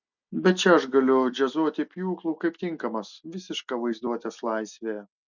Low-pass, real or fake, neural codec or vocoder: 7.2 kHz; real; none